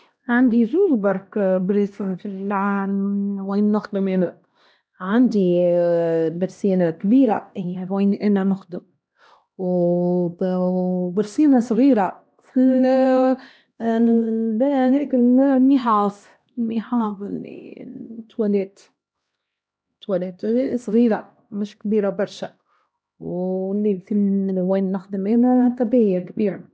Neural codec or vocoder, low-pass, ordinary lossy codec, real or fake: codec, 16 kHz, 1 kbps, X-Codec, HuBERT features, trained on LibriSpeech; none; none; fake